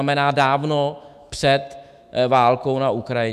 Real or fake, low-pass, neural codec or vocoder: fake; 14.4 kHz; autoencoder, 48 kHz, 128 numbers a frame, DAC-VAE, trained on Japanese speech